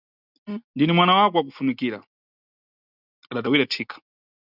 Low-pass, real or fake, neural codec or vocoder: 5.4 kHz; real; none